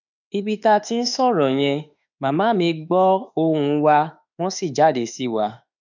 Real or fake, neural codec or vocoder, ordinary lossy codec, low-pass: fake; autoencoder, 48 kHz, 32 numbers a frame, DAC-VAE, trained on Japanese speech; none; 7.2 kHz